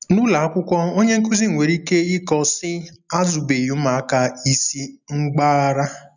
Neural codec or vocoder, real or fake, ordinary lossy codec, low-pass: none; real; none; 7.2 kHz